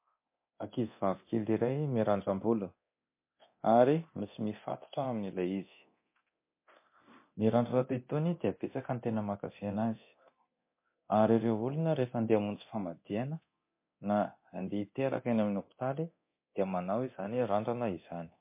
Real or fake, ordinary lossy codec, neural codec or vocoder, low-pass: fake; MP3, 24 kbps; codec, 24 kHz, 0.9 kbps, DualCodec; 3.6 kHz